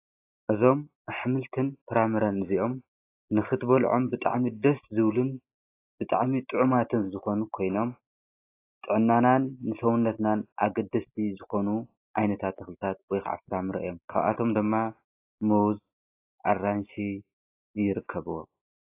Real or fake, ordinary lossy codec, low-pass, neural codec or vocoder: real; AAC, 24 kbps; 3.6 kHz; none